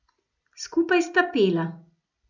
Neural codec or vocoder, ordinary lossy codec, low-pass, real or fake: none; none; 7.2 kHz; real